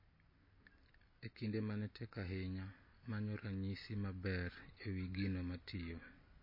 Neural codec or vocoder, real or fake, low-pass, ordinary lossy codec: none; real; 5.4 kHz; MP3, 24 kbps